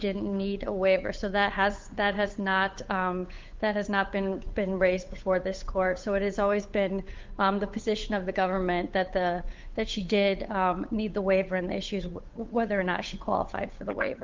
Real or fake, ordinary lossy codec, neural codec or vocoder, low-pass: fake; Opus, 24 kbps; codec, 16 kHz, 8 kbps, FunCodec, trained on Chinese and English, 25 frames a second; 7.2 kHz